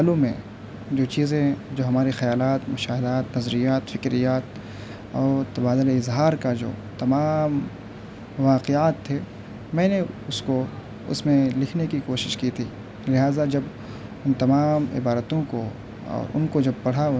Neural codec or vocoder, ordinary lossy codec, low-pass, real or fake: none; none; none; real